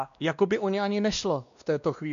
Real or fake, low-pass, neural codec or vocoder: fake; 7.2 kHz; codec, 16 kHz, 1 kbps, X-Codec, WavLM features, trained on Multilingual LibriSpeech